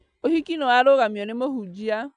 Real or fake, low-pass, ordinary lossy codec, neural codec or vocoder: real; 9.9 kHz; none; none